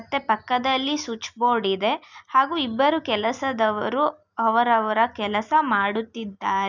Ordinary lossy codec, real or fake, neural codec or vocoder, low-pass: none; real; none; 7.2 kHz